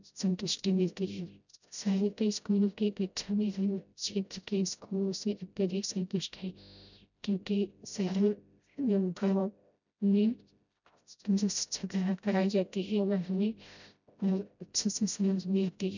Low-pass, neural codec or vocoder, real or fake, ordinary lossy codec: 7.2 kHz; codec, 16 kHz, 0.5 kbps, FreqCodec, smaller model; fake; none